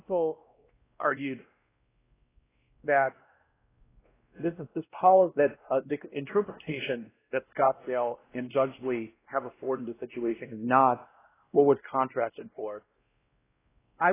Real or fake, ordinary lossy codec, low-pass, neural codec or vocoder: fake; AAC, 16 kbps; 3.6 kHz; codec, 16 kHz, 1 kbps, X-Codec, WavLM features, trained on Multilingual LibriSpeech